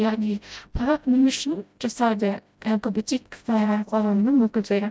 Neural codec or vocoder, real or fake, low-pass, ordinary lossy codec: codec, 16 kHz, 0.5 kbps, FreqCodec, smaller model; fake; none; none